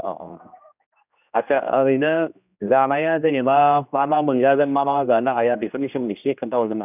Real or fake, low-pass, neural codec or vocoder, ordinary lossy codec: fake; 3.6 kHz; codec, 16 kHz, 1 kbps, X-Codec, HuBERT features, trained on general audio; none